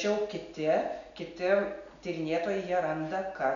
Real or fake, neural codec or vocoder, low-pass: real; none; 7.2 kHz